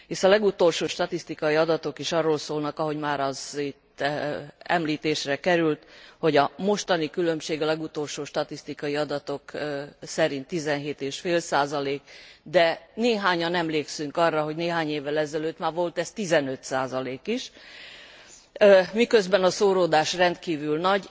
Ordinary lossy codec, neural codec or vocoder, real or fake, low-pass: none; none; real; none